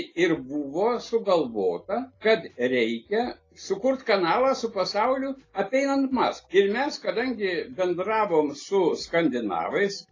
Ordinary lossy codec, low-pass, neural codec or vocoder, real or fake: AAC, 32 kbps; 7.2 kHz; none; real